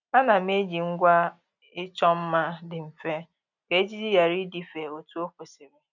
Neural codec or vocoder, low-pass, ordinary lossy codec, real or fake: none; 7.2 kHz; none; real